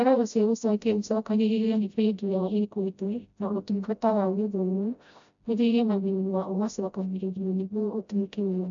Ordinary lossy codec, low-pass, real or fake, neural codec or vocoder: MP3, 64 kbps; 7.2 kHz; fake; codec, 16 kHz, 0.5 kbps, FreqCodec, smaller model